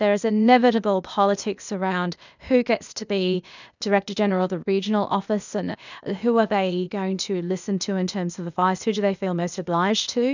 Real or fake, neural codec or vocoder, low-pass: fake; codec, 16 kHz, 0.8 kbps, ZipCodec; 7.2 kHz